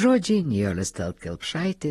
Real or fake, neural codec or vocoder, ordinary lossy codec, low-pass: real; none; AAC, 32 kbps; 19.8 kHz